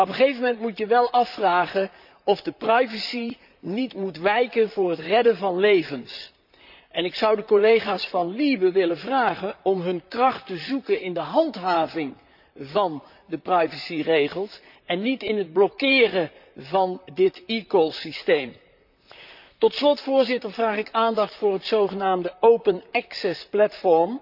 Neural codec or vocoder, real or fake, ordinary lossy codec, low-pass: vocoder, 44.1 kHz, 128 mel bands, Pupu-Vocoder; fake; none; 5.4 kHz